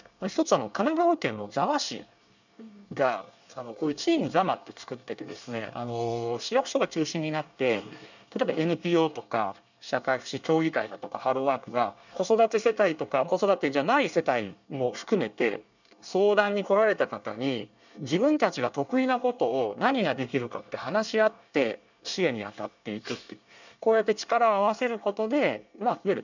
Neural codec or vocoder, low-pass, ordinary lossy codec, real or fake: codec, 24 kHz, 1 kbps, SNAC; 7.2 kHz; none; fake